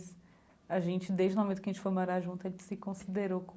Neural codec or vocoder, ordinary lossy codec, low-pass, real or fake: none; none; none; real